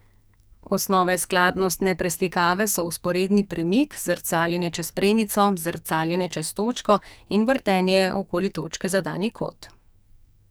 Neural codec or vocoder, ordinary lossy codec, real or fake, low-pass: codec, 44.1 kHz, 2.6 kbps, SNAC; none; fake; none